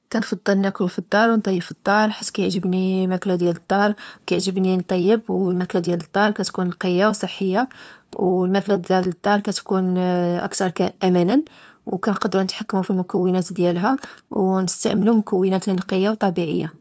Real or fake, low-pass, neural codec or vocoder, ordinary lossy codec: fake; none; codec, 16 kHz, 2 kbps, FunCodec, trained on LibriTTS, 25 frames a second; none